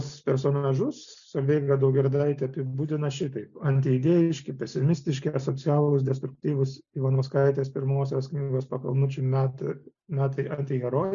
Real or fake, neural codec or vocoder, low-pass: real; none; 7.2 kHz